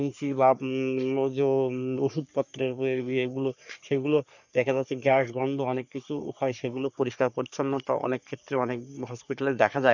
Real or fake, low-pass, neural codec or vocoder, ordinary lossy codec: fake; 7.2 kHz; codec, 44.1 kHz, 3.4 kbps, Pupu-Codec; none